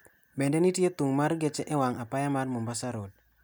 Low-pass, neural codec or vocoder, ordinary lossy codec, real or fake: none; none; none; real